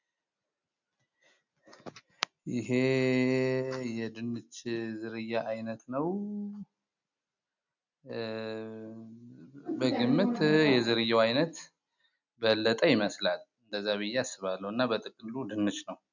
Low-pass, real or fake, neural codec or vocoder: 7.2 kHz; real; none